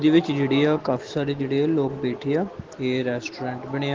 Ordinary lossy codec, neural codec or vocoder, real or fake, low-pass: Opus, 16 kbps; none; real; 7.2 kHz